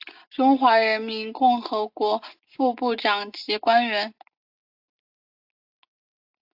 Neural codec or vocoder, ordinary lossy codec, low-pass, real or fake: none; AAC, 48 kbps; 5.4 kHz; real